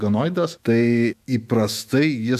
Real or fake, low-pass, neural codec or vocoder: fake; 14.4 kHz; autoencoder, 48 kHz, 128 numbers a frame, DAC-VAE, trained on Japanese speech